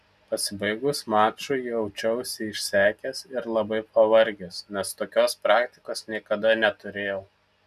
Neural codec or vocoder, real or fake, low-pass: none; real; 14.4 kHz